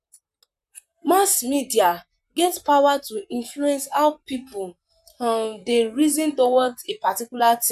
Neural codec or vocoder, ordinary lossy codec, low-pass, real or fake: vocoder, 44.1 kHz, 128 mel bands, Pupu-Vocoder; none; 14.4 kHz; fake